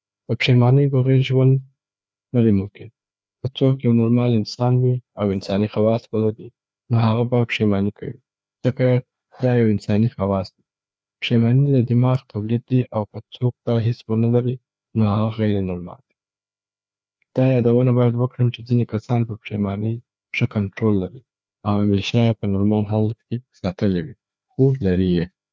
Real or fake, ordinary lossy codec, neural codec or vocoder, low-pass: fake; none; codec, 16 kHz, 2 kbps, FreqCodec, larger model; none